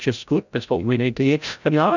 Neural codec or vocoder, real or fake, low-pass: codec, 16 kHz, 0.5 kbps, FreqCodec, larger model; fake; 7.2 kHz